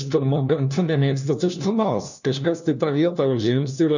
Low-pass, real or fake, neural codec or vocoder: 7.2 kHz; fake; codec, 16 kHz, 1 kbps, FunCodec, trained on LibriTTS, 50 frames a second